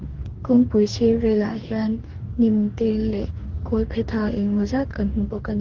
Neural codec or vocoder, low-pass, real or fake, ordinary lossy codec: codec, 44.1 kHz, 2.6 kbps, DAC; 7.2 kHz; fake; Opus, 16 kbps